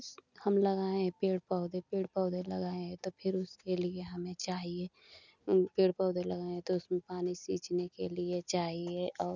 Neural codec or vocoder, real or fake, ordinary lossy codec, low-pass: none; real; none; 7.2 kHz